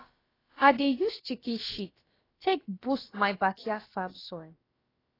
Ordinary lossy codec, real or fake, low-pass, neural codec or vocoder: AAC, 24 kbps; fake; 5.4 kHz; codec, 16 kHz, about 1 kbps, DyCAST, with the encoder's durations